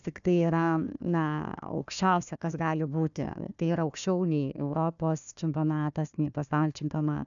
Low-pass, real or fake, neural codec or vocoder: 7.2 kHz; fake; codec, 16 kHz, 1 kbps, FunCodec, trained on Chinese and English, 50 frames a second